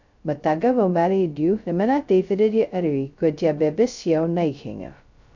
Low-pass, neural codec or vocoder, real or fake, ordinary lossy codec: 7.2 kHz; codec, 16 kHz, 0.2 kbps, FocalCodec; fake; none